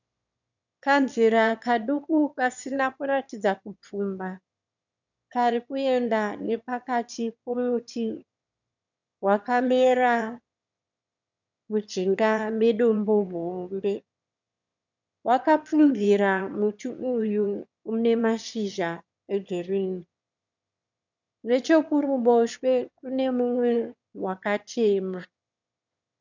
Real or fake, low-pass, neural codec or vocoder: fake; 7.2 kHz; autoencoder, 22.05 kHz, a latent of 192 numbers a frame, VITS, trained on one speaker